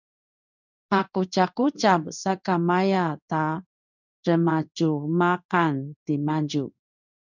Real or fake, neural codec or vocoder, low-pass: fake; codec, 16 kHz in and 24 kHz out, 1 kbps, XY-Tokenizer; 7.2 kHz